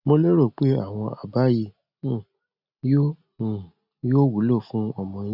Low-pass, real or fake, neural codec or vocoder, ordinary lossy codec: 5.4 kHz; real; none; none